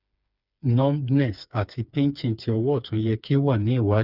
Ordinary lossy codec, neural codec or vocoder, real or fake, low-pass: none; codec, 16 kHz, 4 kbps, FreqCodec, smaller model; fake; 5.4 kHz